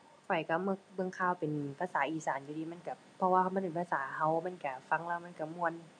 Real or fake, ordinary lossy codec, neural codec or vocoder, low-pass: real; none; none; 9.9 kHz